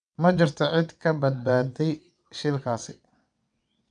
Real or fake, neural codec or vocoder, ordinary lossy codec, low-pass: fake; vocoder, 22.05 kHz, 80 mel bands, WaveNeXt; none; 9.9 kHz